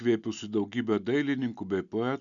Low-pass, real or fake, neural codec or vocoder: 7.2 kHz; real; none